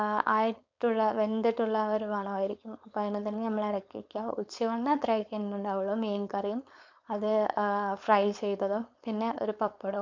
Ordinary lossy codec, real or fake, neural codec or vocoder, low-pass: MP3, 64 kbps; fake; codec, 16 kHz, 4.8 kbps, FACodec; 7.2 kHz